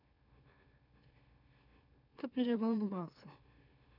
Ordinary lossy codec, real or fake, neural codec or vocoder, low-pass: none; fake; autoencoder, 44.1 kHz, a latent of 192 numbers a frame, MeloTTS; 5.4 kHz